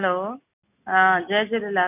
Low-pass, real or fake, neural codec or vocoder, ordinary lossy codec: 3.6 kHz; real; none; none